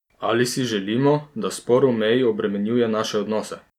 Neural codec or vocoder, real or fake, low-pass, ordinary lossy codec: none; real; 19.8 kHz; none